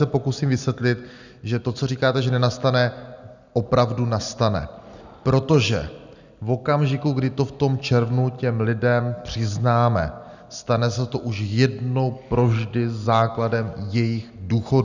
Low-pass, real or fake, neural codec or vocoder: 7.2 kHz; real; none